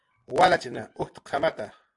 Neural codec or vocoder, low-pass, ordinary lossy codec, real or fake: none; 10.8 kHz; AAC, 64 kbps; real